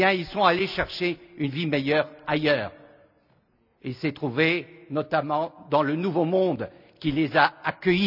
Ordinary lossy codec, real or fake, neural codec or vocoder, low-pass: none; real; none; 5.4 kHz